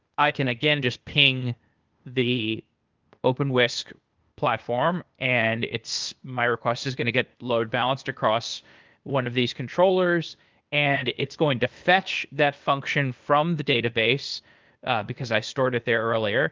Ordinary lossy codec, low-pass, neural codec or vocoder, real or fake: Opus, 24 kbps; 7.2 kHz; codec, 16 kHz, 0.8 kbps, ZipCodec; fake